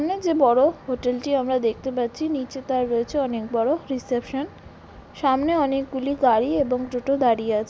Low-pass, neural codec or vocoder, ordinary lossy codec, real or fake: none; none; none; real